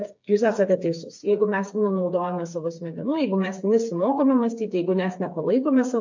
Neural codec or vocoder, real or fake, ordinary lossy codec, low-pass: codec, 16 kHz, 4 kbps, FreqCodec, smaller model; fake; MP3, 48 kbps; 7.2 kHz